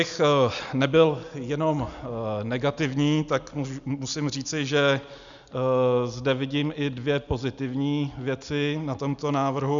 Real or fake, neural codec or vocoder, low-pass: real; none; 7.2 kHz